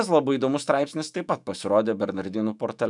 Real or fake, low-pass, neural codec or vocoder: fake; 10.8 kHz; autoencoder, 48 kHz, 128 numbers a frame, DAC-VAE, trained on Japanese speech